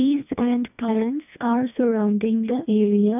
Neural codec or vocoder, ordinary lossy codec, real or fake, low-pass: codec, 24 kHz, 1.5 kbps, HILCodec; none; fake; 3.6 kHz